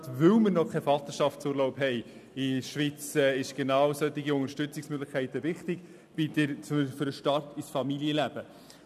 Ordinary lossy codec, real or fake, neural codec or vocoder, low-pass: none; real; none; 14.4 kHz